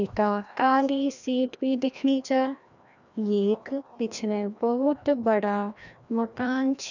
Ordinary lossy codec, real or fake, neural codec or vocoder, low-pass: none; fake; codec, 16 kHz, 1 kbps, FreqCodec, larger model; 7.2 kHz